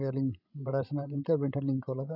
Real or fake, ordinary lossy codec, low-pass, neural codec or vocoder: fake; none; 5.4 kHz; codec, 16 kHz, 16 kbps, FreqCodec, larger model